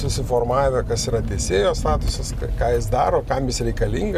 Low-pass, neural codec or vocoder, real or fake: 14.4 kHz; none; real